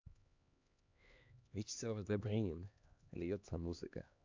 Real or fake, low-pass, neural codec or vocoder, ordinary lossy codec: fake; 7.2 kHz; codec, 16 kHz, 2 kbps, X-Codec, HuBERT features, trained on LibriSpeech; MP3, 64 kbps